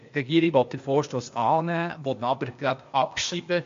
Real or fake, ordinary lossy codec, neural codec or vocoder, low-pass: fake; MP3, 48 kbps; codec, 16 kHz, 0.8 kbps, ZipCodec; 7.2 kHz